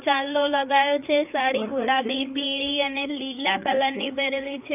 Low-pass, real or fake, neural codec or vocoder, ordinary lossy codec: 3.6 kHz; fake; codec, 16 kHz, 4 kbps, FreqCodec, larger model; none